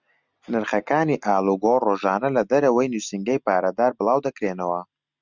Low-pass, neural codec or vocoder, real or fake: 7.2 kHz; none; real